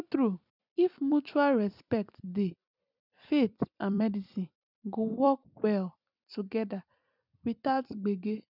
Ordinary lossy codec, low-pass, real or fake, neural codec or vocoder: none; 5.4 kHz; fake; vocoder, 44.1 kHz, 128 mel bands every 256 samples, BigVGAN v2